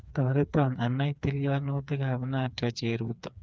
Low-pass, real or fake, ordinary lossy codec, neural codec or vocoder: none; fake; none; codec, 16 kHz, 4 kbps, FreqCodec, smaller model